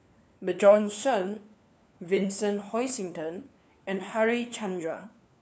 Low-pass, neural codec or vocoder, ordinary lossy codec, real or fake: none; codec, 16 kHz, 4 kbps, FunCodec, trained on LibriTTS, 50 frames a second; none; fake